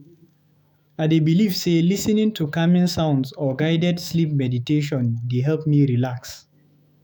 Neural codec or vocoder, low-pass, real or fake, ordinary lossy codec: autoencoder, 48 kHz, 128 numbers a frame, DAC-VAE, trained on Japanese speech; none; fake; none